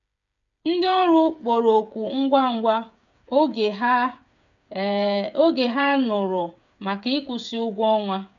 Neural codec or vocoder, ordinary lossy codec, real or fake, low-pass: codec, 16 kHz, 8 kbps, FreqCodec, smaller model; none; fake; 7.2 kHz